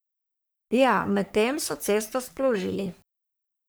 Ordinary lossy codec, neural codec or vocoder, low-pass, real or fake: none; codec, 44.1 kHz, 3.4 kbps, Pupu-Codec; none; fake